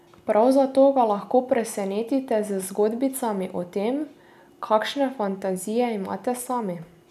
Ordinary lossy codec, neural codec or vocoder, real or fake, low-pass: AAC, 96 kbps; none; real; 14.4 kHz